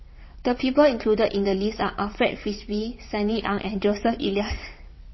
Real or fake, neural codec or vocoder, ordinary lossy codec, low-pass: fake; vocoder, 22.05 kHz, 80 mel bands, WaveNeXt; MP3, 24 kbps; 7.2 kHz